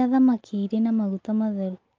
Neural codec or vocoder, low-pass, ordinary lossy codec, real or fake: none; 7.2 kHz; Opus, 24 kbps; real